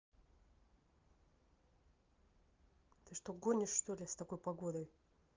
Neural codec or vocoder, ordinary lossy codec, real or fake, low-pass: none; Opus, 32 kbps; real; 7.2 kHz